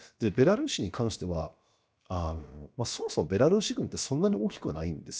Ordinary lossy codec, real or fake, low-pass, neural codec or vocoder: none; fake; none; codec, 16 kHz, about 1 kbps, DyCAST, with the encoder's durations